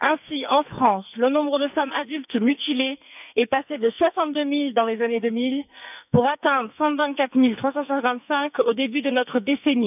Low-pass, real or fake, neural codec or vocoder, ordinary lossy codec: 3.6 kHz; fake; codec, 44.1 kHz, 2.6 kbps, SNAC; none